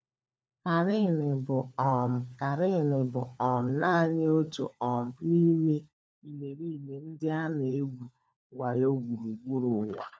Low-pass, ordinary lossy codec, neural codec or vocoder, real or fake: none; none; codec, 16 kHz, 4 kbps, FunCodec, trained on LibriTTS, 50 frames a second; fake